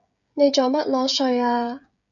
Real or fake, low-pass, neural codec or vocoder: fake; 7.2 kHz; codec, 16 kHz, 16 kbps, FreqCodec, smaller model